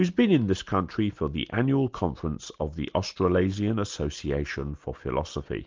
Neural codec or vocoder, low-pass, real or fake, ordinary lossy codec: none; 7.2 kHz; real; Opus, 32 kbps